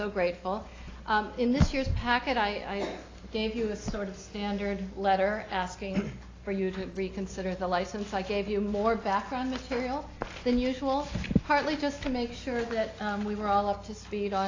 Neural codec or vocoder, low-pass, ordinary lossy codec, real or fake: none; 7.2 kHz; AAC, 32 kbps; real